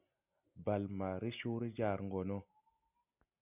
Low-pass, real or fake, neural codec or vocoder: 3.6 kHz; real; none